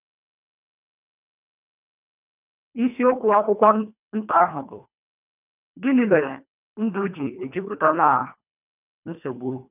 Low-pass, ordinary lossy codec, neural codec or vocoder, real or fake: 3.6 kHz; none; codec, 24 kHz, 1.5 kbps, HILCodec; fake